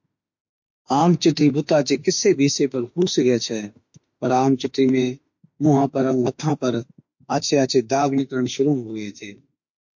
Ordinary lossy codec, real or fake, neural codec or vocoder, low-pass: MP3, 48 kbps; fake; autoencoder, 48 kHz, 32 numbers a frame, DAC-VAE, trained on Japanese speech; 7.2 kHz